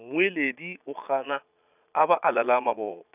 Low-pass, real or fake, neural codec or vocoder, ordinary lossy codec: 3.6 kHz; fake; vocoder, 22.05 kHz, 80 mel bands, Vocos; none